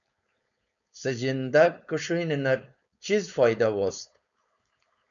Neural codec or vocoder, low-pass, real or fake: codec, 16 kHz, 4.8 kbps, FACodec; 7.2 kHz; fake